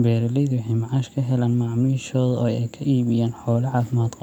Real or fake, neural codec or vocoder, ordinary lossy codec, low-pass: real; none; none; 19.8 kHz